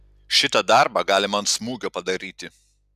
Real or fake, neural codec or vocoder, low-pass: real; none; 14.4 kHz